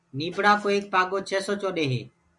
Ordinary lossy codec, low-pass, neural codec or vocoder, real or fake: MP3, 96 kbps; 10.8 kHz; none; real